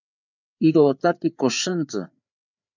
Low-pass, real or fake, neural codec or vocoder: 7.2 kHz; fake; codec, 16 kHz, 4 kbps, FreqCodec, larger model